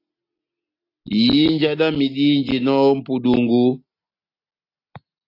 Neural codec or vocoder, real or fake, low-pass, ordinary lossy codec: none; real; 5.4 kHz; AAC, 32 kbps